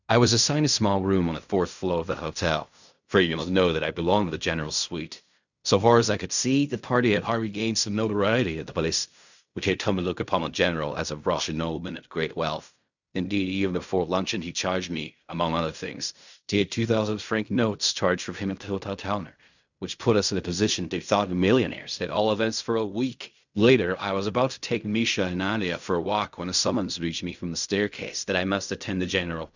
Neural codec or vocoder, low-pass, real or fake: codec, 16 kHz in and 24 kHz out, 0.4 kbps, LongCat-Audio-Codec, fine tuned four codebook decoder; 7.2 kHz; fake